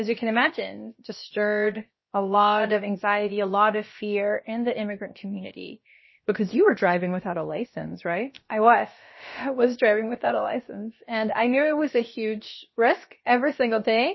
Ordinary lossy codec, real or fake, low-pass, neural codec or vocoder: MP3, 24 kbps; fake; 7.2 kHz; codec, 16 kHz, about 1 kbps, DyCAST, with the encoder's durations